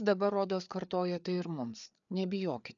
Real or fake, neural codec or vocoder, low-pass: fake; codec, 16 kHz, 4 kbps, FreqCodec, larger model; 7.2 kHz